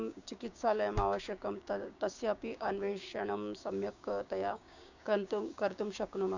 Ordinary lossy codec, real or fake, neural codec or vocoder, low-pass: none; fake; codec, 16 kHz, 6 kbps, DAC; 7.2 kHz